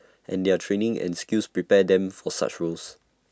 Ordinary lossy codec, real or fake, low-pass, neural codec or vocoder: none; real; none; none